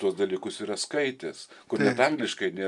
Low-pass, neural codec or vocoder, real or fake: 10.8 kHz; none; real